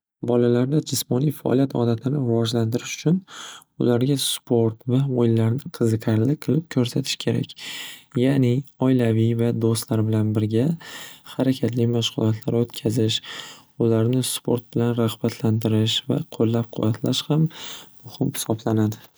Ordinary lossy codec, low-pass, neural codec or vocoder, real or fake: none; none; none; real